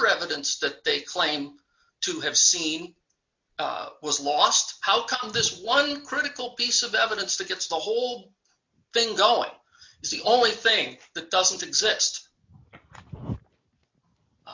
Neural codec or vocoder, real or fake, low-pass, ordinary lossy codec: none; real; 7.2 kHz; MP3, 48 kbps